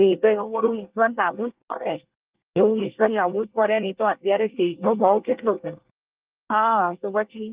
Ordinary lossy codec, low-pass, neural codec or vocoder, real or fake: Opus, 32 kbps; 3.6 kHz; codec, 24 kHz, 1 kbps, SNAC; fake